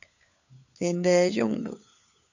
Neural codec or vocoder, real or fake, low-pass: codec, 16 kHz, 4 kbps, FunCodec, trained on LibriTTS, 50 frames a second; fake; 7.2 kHz